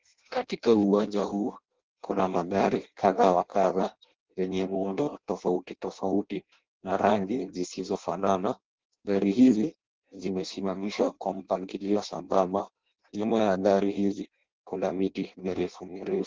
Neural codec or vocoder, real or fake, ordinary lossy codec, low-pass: codec, 16 kHz in and 24 kHz out, 0.6 kbps, FireRedTTS-2 codec; fake; Opus, 16 kbps; 7.2 kHz